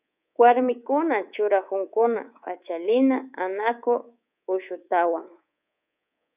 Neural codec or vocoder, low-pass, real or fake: codec, 24 kHz, 3.1 kbps, DualCodec; 3.6 kHz; fake